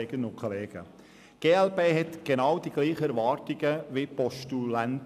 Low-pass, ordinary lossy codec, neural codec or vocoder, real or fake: 14.4 kHz; none; none; real